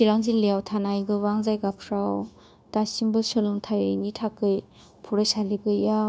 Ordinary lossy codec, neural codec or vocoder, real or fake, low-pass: none; codec, 16 kHz, 0.9 kbps, LongCat-Audio-Codec; fake; none